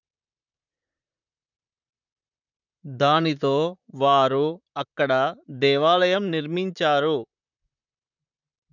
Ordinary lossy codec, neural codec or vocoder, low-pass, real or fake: none; vocoder, 44.1 kHz, 128 mel bands, Pupu-Vocoder; 7.2 kHz; fake